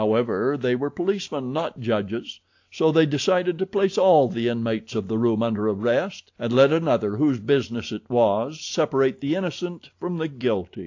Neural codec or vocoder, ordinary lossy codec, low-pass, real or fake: none; AAC, 48 kbps; 7.2 kHz; real